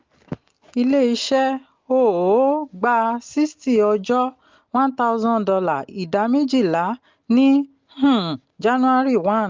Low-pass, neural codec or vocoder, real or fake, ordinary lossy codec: 7.2 kHz; none; real; Opus, 32 kbps